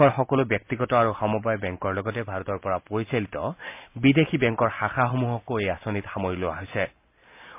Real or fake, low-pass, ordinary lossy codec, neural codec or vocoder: real; 3.6 kHz; MP3, 32 kbps; none